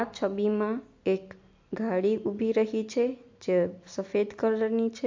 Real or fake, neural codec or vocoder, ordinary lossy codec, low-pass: real; none; MP3, 48 kbps; 7.2 kHz